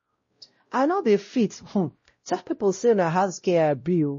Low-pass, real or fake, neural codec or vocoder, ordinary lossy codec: 7.2 kHz; fake; codec, 16 kHz, 0.5 kbps, X-Codec, WavLM features, trained on Multilingual LibriSpeech; MP3, 32 kbps